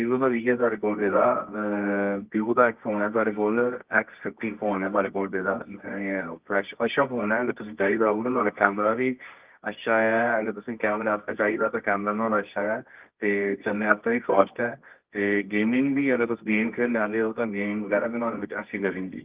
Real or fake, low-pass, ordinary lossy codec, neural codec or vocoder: fake; 3.6 kHz; Opus, 32 kbps; codec, 24 kHz, 0.9 kbps, WavTokenizer, medium music audio release